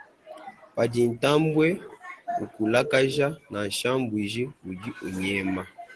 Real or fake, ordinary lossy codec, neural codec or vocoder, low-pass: real; Opus, 16 kbps; none; 10.8 kHz